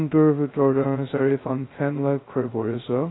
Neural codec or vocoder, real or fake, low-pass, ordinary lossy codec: codec, 16 kHz, 0.2 kbps, FocalCodec; fake; 7.2 kHz; AAC, 16 kbps